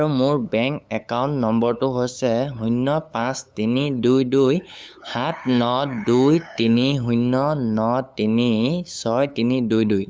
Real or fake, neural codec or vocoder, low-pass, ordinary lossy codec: fake; codec, 16 kHz, 8 kbps, FunCodec, trained on LibriTTS, 25 frames a second; none; none